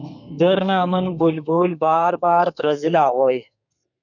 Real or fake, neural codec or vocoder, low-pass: fake; codec, 32 kHz, 1.9 kbps, SNAC; 7.2 kHz